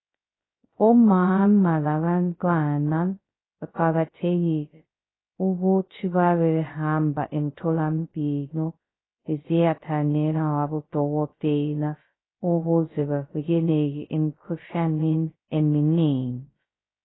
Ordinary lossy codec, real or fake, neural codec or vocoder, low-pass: AAC, 16 kbps; fake; codec, 16 kHz, 0.2 kbps, FocalCodec; 7.2 kHz